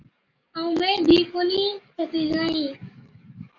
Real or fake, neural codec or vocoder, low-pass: fake; codec, 44.1 kHz, 7.8 kbps, DAC; 7.2 kHz